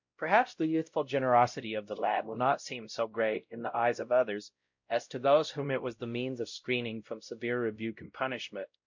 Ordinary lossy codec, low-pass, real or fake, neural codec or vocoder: MP3, 48 kbps; 7.2 kHz; fake; codec, 16 kHz, 0.5 kbps, X-Codec, WavLM features, trained on Multilingual LibriSpeech